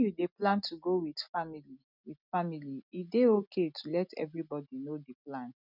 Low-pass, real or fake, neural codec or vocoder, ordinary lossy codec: 5.4 kHz; real; none; none